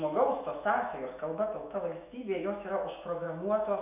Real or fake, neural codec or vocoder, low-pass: fake; autoencoder, 48 kHz, 128 numbers a frame, DAC-VAE, trained on Japanese speech; 3.6 kHz